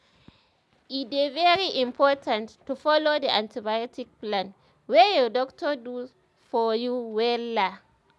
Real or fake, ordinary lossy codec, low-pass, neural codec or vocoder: real; none; none; none